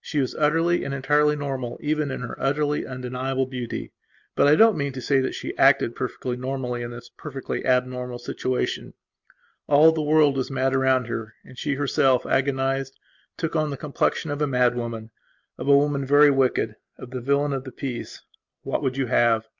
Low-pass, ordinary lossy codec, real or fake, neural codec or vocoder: 7.2 kHz; Opus, 64 kbps; real; none